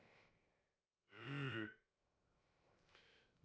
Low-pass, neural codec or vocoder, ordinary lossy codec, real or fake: none; codec, 16 kHz, 0.3 kbps, FocalCodec; none; fake